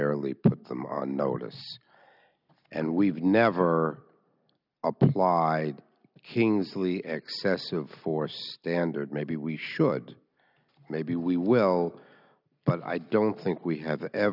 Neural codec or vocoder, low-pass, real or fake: none; 5.4 kHz; real